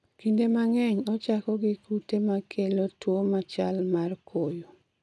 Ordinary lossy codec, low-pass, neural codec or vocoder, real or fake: none; none; none; real